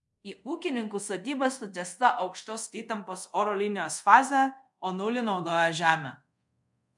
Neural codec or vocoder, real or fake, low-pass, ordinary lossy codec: codec, 24 kHz, 0.5 kbps, DualCodec; fake; 10.8 kHz; MP3, 64 kbps